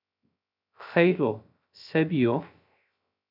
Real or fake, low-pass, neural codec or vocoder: fake; 5.4 kHz; codec, 16 kHz, 0.3 kbps, FocalCodec